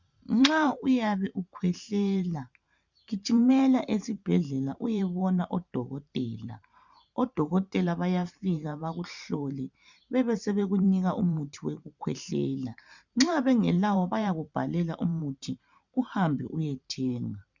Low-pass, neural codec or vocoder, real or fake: 7.2 kHz; vocoder, 44.1 kHz, 128 mel bands every 512 samples, BigVGAN v2; fake